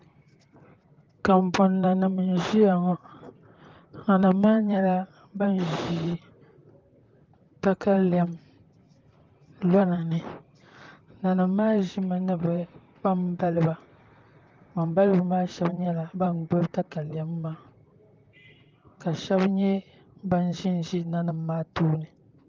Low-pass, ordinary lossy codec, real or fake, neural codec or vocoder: 7.2 kHz; Opus, 32 kbps; fake; vocoder, 44.1 kHz, 128 mel bands, Pupu-Vocoder